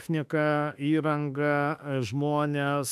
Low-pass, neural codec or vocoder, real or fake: 14.4 kHz; autoencoder, 48 kHz, 32 numbers a frame, DAC-VAE, trained on Japanese speech; fake